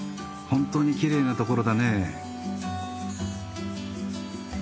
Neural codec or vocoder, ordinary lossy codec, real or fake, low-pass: none; none; real; none